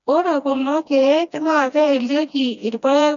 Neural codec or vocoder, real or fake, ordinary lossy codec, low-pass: codec, 16 kHz, 1 kbps, FreqCodec, smaller model; fake; none; 7.2 kHz